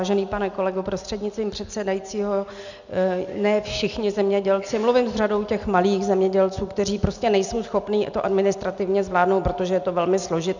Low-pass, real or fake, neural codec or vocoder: 7.2 kHz; real; none